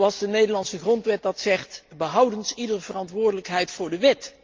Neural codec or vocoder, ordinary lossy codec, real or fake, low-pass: none; Opus, 24 kbps; real; 7.2 kHz